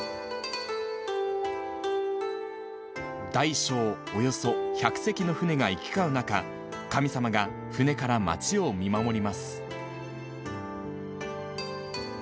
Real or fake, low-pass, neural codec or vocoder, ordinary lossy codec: real; none; none; none